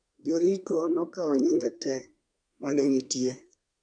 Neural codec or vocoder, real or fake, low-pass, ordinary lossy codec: codec, 24 kHz, 1 kbps, SNAC; fake; 9.9 kHz; none